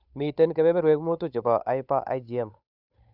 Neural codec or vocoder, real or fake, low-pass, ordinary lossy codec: codec, 16 kHz, 8 kbps, FunCodec, trained on Chinese and English, 25 frames a second; fake; 5.4 kHz; none